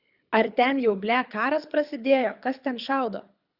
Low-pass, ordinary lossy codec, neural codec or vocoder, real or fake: 5.4 kHz; Opus, 64 kbps; codec, 24 kHz, 6 kbps, HILCodec; fake